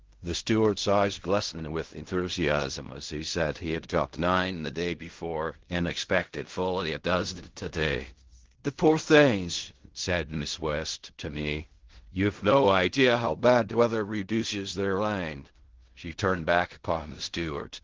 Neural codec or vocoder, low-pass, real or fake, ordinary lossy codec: codec, 16 kHz in and 24 kHz out, 0.4 kbps, LongCat-Audio-Codec, fine tuned four codebook decoder; 7.2 kHz; fake; Opus, 16 kbps